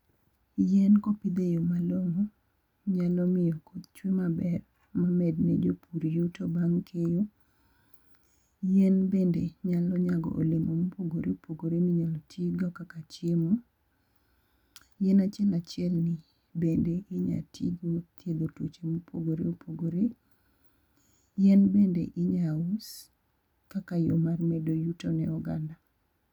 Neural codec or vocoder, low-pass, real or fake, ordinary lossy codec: none; 19.8 kHz; real; none